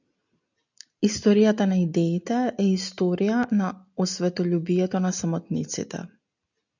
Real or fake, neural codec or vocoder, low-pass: real; none; 7.2 kHz